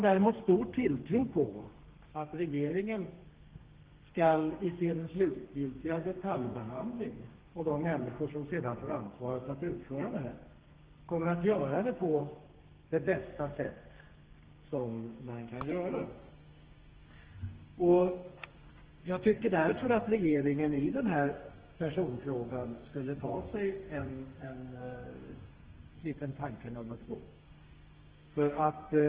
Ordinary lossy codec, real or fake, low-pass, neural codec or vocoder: Opus, 16 kbps; fake; 3.6 kHz; codec, 32 kHz, 1.9 kbps, SNAC